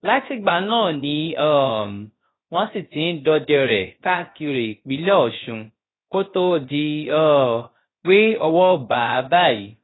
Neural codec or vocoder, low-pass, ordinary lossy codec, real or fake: codec, 16 kHz, about 1 kbps, DyCAST, with the encoder's durations; 7.2 kHz; AAC, 16 kbps; fake